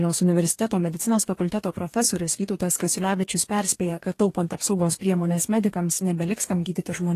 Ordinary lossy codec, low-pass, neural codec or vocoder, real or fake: AAC, 48 kbps; 14.4 kHz; codec, 44.1 kHz, 2.6 kbps, DAC; fake